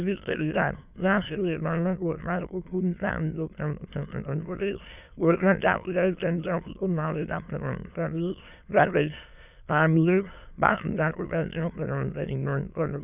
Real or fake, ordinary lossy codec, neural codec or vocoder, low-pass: fake; none; autoencoder, 22.05 kHz, a latent of 192 numbers a frame, VITS, trained on many speakers; 3.6 kHz